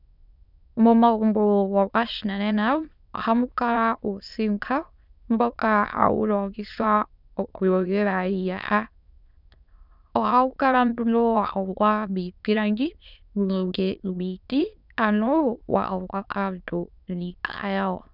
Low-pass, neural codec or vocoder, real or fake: 5.4 kHz; autoencoder, 22.05 kHz, a latent of 192 numbers a frame, VITS, trained on many speakers; fake